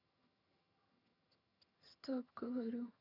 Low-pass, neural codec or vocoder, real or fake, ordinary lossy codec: 5.4 kHz; vocoder, 22.05 kHz, 80 mel bands, HiFi-GAN; fake; none